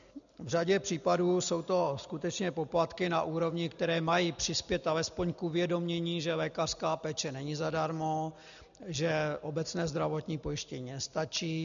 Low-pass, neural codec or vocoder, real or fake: 7.2 kHz; none; real